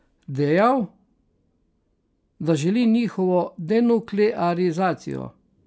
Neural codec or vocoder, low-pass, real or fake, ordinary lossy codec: none; none; real; none